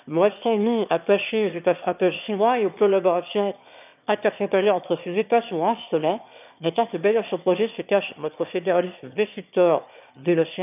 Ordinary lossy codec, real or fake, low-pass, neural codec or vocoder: none; fake; 3.6 kHz; autoencoder, 22.05 kHz, a latent of 192 numbers a frame, VITS, trained on one speaker